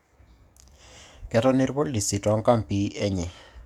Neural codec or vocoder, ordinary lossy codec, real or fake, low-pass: codec, 44.1 kHz, 7.8 kbps, DAC; none; fake; 19.8 kHz